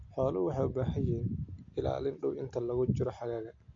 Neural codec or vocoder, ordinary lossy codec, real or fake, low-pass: none; MP3, 48 kbps; real; 7.2 kHz